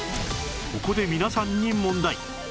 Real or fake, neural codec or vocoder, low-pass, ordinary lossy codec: real; none; none; none